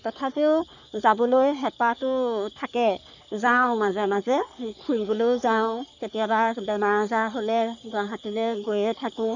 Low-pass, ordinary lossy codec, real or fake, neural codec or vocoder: 7.2 kHz; none; fake; codec, 44.1 kHz, 3.4 kbps, Pupu-Codec